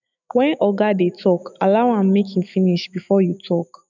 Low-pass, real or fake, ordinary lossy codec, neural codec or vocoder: 7.2 kHz; fake; none; autoencoder, 48 kHz, 128 numbers a frame, DAC-VAE, trained on Japanese speech